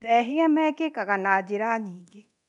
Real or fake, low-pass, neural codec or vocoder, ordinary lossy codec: fake; 10.8 kHz; codec, 24 kHz, 0.9 kbps, DualCodec; none